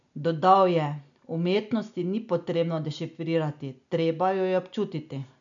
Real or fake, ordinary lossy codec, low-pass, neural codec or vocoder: real; none; 7.2 kHz; none